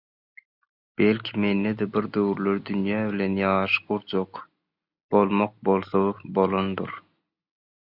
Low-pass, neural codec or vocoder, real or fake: 5.4 kHz; none; real